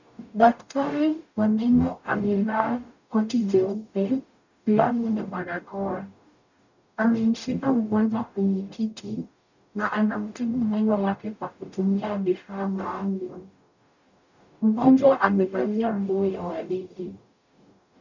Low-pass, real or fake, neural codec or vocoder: 7.2 kHz; fake; codec, 44.1 kHz, 0.9 kbps, DAC